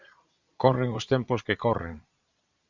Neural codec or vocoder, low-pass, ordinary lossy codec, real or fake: vocoder, 22.05 kHz, 80 mel bands, Vocos; 7.2 kHz; Opus, 64 kbps; fake